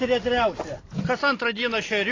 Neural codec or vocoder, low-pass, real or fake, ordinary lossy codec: none; 7.2 kHz; real; AAC, 32 kbps